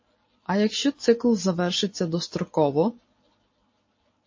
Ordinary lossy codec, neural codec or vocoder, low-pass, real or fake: MP3, 32 kbps; none; 7.2 kHz; real